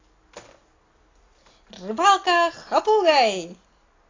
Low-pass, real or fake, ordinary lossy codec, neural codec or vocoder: 7.2 kHz; real; AAC, 32 kbps; none